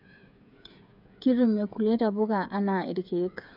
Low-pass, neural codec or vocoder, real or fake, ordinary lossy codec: 5.4 kHz; codec, 16 kHz, 8 kbps, FreqCodec, smaller model; fake; none